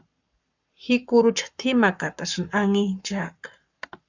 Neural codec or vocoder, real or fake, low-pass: codec, 44.1 kHz, 7.8 kbps, Pupu-Codec; fake; 7.2 kHz